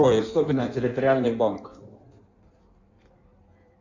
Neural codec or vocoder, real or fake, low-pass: codec, 16 kHz in and 24 kHz out, 1.1 kbps, FireRedTTS-2 codec; fake; 7.2 kHz